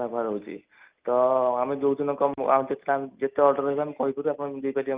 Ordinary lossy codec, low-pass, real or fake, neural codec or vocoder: Opus, 32 kbps; 3.6 kHz; real; none